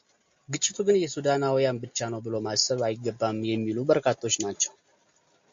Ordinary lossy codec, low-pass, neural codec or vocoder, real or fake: MP3, 48 kbps; 7.2 kHz; none; real